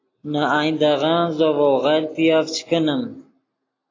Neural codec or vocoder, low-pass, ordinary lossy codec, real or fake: none; 7.2 kHz; AAC, 32 kbps; real